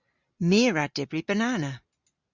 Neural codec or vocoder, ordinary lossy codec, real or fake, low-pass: none; Opus, 64 kbps; real; 7.2 kHz